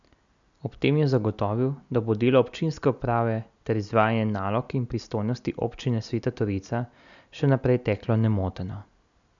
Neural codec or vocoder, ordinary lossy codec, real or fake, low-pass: none; none; real; 7.2 kHz